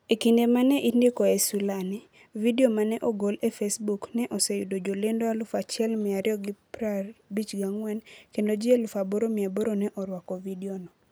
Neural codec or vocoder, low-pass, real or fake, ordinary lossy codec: none; none; real; none